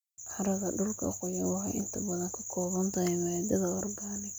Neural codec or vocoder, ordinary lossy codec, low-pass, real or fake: none; none; none; real